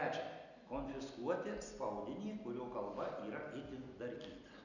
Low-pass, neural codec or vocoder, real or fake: 7.2 kHz; none; real